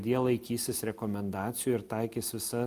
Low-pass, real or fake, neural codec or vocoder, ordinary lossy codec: 14.4 kHz; real; none; Opus, 32 kbps